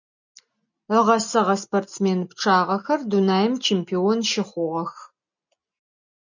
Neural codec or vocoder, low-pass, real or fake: none; 7.2 kHz; real